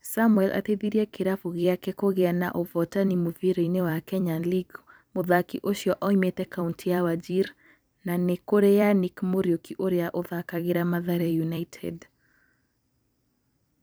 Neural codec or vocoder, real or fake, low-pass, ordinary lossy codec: vocoder, 44.1 kHz, 128 mel bands every 256 samples, BigVGAN v2; fake; none; none